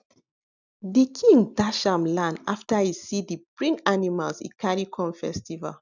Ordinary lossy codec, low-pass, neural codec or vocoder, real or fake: none; 7.2 kHz; none; real